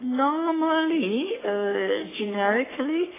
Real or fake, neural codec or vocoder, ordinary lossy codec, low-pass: fake; codec, 44.1 kHz, 3.4 kbps, Pupu-Codec; AAC, 16 kbps; 3.6 kHz